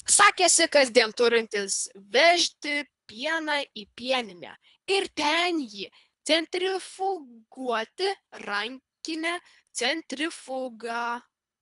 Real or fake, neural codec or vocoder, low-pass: fake; codec, 24 kHz, 3 kbps, HILCodec; 10.8 kHz